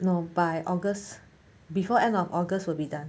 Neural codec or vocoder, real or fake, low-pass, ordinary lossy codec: none; real; none; none